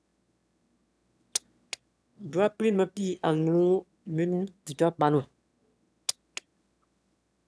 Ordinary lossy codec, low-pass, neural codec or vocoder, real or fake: none; none; autoencoder, 22.05 kHz, a latent of 192 numbers a frame, VITS, trained on one speaker; fake